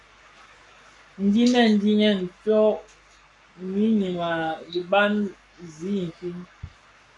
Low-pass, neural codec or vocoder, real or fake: 10.8 kHz; codec, 44.1 kHz, 7.8 kbps, Pupu-Codec; fake